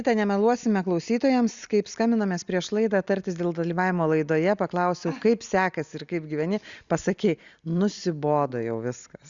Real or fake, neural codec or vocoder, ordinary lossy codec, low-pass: real; none; Opus, 64 kbps; 7.2 kHz